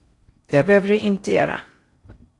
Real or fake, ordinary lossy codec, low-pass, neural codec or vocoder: fake; AAC, 48 kbps; 10.8 kHz; codec, 16 kHz in and 24 kHz out, 0.6 kbps, FocalCodec, streaming, 4096 codes